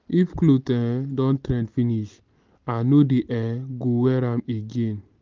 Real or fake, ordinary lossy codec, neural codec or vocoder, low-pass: real; Opus, 24 kbps; none; 7.2 kHz